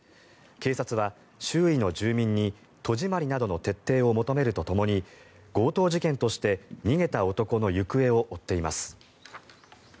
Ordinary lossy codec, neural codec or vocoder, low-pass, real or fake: none; none; none; real